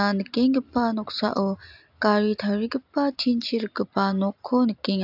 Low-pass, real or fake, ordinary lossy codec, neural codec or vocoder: 5.4 kHz; real; AAC, 48 kbps; none